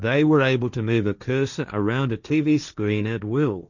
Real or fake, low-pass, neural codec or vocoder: fake; 7.2 kHz; codec, 16 kHz, 1.1 kbps, Voila-Tokenizer